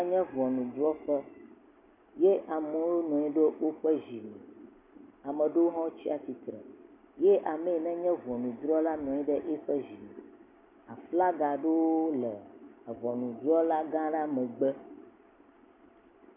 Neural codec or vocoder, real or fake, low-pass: none; real; 3.6 kHz